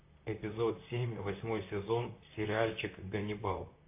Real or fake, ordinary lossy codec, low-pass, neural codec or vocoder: fake; AAC, 24 kbps; 3.6 kHz; vocoder, 44.1 kHz, 128 mel bands, Pupu-Vocoder